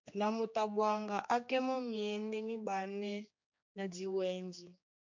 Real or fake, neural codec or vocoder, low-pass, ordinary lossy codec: fake; codec, 16 kHz, 2 kbps, X-Codec, HuBERT features, trained on general audio; 7.2 kHz; MP3, 48 kbps